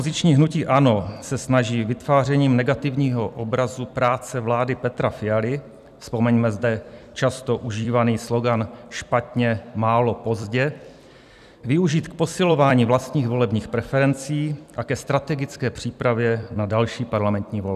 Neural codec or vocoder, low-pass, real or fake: vocoder, 44.1 kHz, 128 mel bands every 256 samples, BigVGAN v2; 14.4 kHz; fake